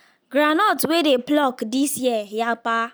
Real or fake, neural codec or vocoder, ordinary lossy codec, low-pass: real; none; none; none